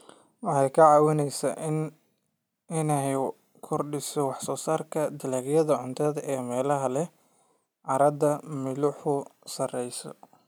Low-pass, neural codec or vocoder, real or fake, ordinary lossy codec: none; none; real; none